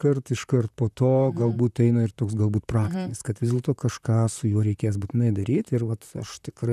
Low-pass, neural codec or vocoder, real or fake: 14.4 kHz; vocoder, 44.1 kHz, 128 mel bands, Pupu-Vocoder; fake